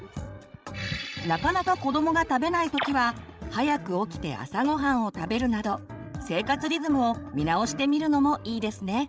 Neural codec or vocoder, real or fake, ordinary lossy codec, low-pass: codec, 16 kHz, 16 kbps, FreqCodec, larger model; fake; none; none